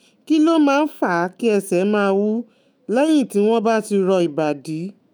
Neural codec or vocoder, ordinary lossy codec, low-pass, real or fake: autoencoder, 48 kHz, 128 numbers a frame, DAC-VAE, trained on Japanese speech; none; none; fake